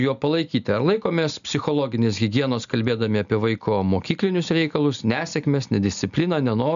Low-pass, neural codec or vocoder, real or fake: 7.2 kHz; none; real